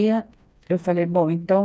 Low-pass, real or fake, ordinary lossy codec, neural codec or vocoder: none; fake; none; codec, 16 kHz, 2 kbps, FreqCodec, smaller model